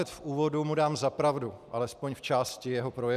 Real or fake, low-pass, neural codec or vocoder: real; 14.4 kHz; none